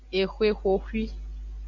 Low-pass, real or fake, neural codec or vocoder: 7.2 kHz; real; none